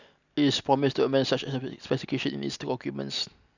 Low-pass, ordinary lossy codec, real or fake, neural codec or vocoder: 7.2 kHz; none; real; none